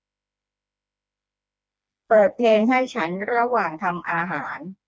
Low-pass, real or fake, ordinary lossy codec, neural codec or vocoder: none; fake; none; codec, 16 kHz, 2 kbps, FreqCodec, smaller model